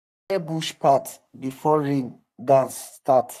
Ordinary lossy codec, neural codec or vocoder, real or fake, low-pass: none; codec, 44.1 kHz, 3.4 kbps, Pupu-Codec; fake; 14.4 kHz